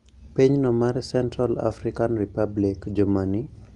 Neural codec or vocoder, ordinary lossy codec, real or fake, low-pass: none; Opus, 32 kbps; real; 10.8 kHz